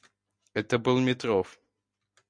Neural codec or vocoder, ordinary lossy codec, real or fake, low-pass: none; MP3, 64 kbps; real; 9.9 kHz